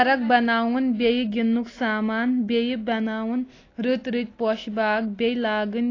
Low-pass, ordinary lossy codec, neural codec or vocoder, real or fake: 7.2 kHz; AAC, 32 kbps; none; real